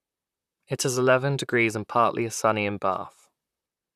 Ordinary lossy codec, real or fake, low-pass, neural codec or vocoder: none; fake; 14.4 kHz; vocoder, 44.1 kHz, 128 mel bands, Pupu-Vocoder